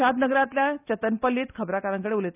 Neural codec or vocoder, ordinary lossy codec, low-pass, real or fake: none; none; 3.6 kHz; real